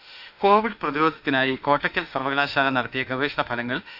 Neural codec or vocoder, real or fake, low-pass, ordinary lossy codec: autoencoder, 48 kHz, 32 numbers a frame, DAC-VAE, trained on Japanese speech; fake; 5.4 kHz; none